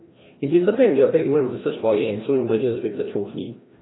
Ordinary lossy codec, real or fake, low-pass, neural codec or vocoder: AAC, 16 kbps; fake; 7.2 kHz; codec, 16 kHz, 1 kbps, FreqCodec, larger model